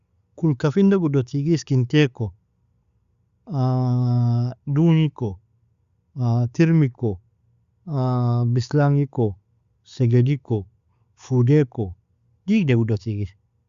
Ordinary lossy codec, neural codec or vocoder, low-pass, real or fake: Opus, 64 kbps; none; 7.2 kHz; real